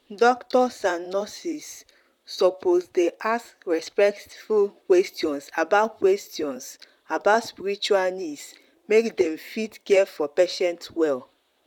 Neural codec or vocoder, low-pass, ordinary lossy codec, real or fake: vocoder, 44.1 kHz, 128 mel bands, Pupu-Vocoder; 19.8 kHz; none; fake